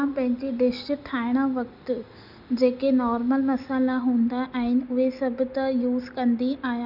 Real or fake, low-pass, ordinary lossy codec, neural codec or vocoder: real; 5.4 kHz; none; none